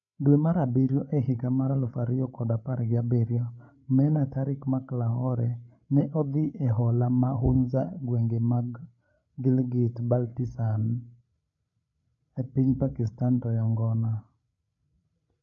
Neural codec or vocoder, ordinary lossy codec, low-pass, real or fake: codec, 16 kHz, 16 kbps, FreqCodec, larger model; none; 7.2 kHz; fake